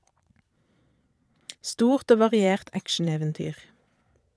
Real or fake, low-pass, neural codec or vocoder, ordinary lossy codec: fake; none; vocoder, 22.05 kHz, 80 mel bands, Vocos; none